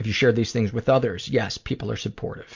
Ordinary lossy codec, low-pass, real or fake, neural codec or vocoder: MP3, 48 kbps; 7.2 kHz; real; none